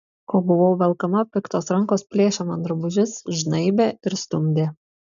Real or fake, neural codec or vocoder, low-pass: real; none; 7.2 kHz